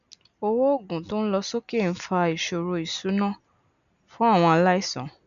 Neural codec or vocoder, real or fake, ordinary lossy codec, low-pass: none; real; none; 7.2 kHz